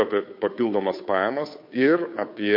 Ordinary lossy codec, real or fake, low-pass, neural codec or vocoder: MP3, 32 kbps; fake; 5.4 kHz; codec, 16 kHz, 2 kbps, FunCodec, trained on Chinese and English, 25 frames a second